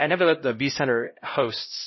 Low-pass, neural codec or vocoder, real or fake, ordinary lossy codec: 7.2 kHz; codec, 16 kHz, 0.5 kbps, X-Codec, HuBERT features, trained on LibriSpeech; fake; MP3, 24 kbps